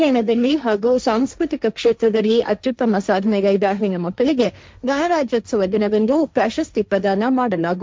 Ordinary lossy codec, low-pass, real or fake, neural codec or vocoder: none; none; fake; codec, 16 kHz, 1.1 kbps, Voila-Tokenizer